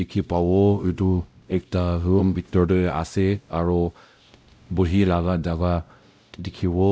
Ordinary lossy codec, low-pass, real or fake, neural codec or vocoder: none; none; fake; codec, 16 kHz, 0.5 kbps, X-Codec, WavLM features, trained on Multilingual LibriSpeech